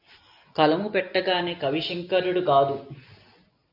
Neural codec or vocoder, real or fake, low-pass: none; real; 5.4 kHz